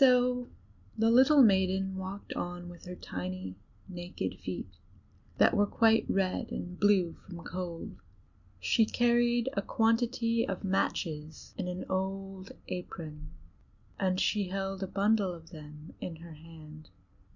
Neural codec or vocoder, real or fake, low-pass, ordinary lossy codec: none; real; 7.2 kHz; Opus, 64 kbps